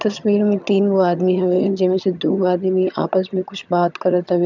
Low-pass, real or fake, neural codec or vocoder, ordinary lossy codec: 7.2 kHz; fake; vocoder, 22.05 kHz, 80 mel bands, HiFi-GAN; none